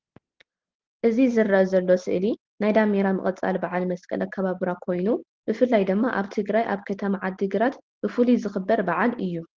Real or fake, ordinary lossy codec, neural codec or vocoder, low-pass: real; Opus, 16 kbps; none; 7.2 kHz